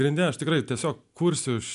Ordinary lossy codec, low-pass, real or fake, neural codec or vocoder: MP3, 96 kbps; 10.8 kHz; real; none